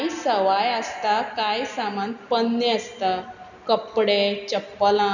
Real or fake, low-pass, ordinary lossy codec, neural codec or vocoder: real; 7.2 kHz; none; none